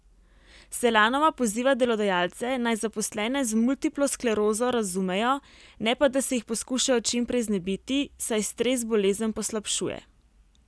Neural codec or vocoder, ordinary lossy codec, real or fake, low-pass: none; none; real; none